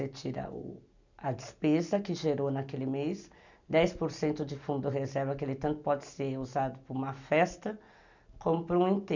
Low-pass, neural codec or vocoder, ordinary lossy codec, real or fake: 7.2 kHz; none; none; real